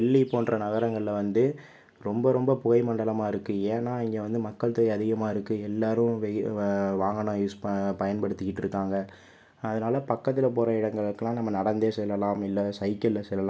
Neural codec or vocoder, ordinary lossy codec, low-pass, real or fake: none; none; none; real